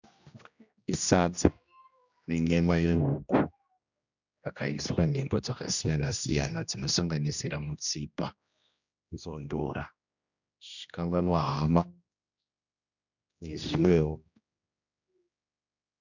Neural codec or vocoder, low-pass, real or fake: codec, 16 kHz, 1 kbps, X-Codec, HuBERT features, trained on general audio; 7.2 kHz; fake